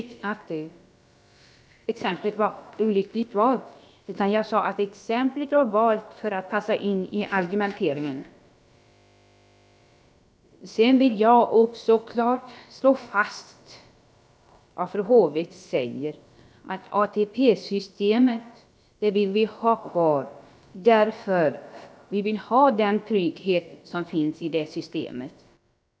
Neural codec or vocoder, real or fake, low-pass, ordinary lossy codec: codec, 16 kHz, about 1 kbps, DyCAST, with the encoder's durations; fake; none; none